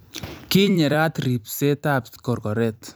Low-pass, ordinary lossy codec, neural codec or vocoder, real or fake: none; none; vocoder, 44.1 kHz, 128 mel bands every 256 samples, BigVGAN v2; fake